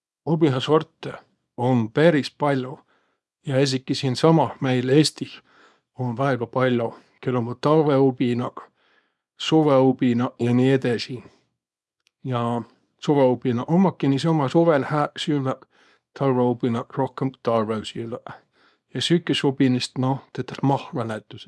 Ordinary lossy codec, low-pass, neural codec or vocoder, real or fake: none; none; codec, 24 kHz, 0.9 kbps, WavTokenizer, small release; fake